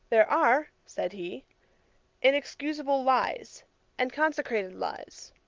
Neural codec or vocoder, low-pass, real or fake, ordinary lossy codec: none; 7.2 kHz; real; Opus, 32 kbps